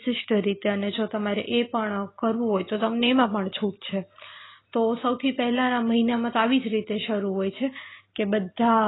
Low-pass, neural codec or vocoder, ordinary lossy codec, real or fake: 7.2 kHz; none; AAC, 16 kbps; real